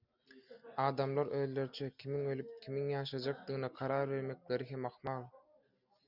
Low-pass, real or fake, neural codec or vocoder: 5.4 kHz; real; none